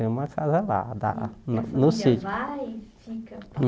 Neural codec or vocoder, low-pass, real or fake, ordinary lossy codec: none; none; real; none